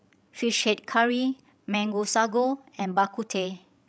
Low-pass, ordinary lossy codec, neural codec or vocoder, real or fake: none; none; codec, 16 kHz, 16 kbps, FreqCodec, larger model; fake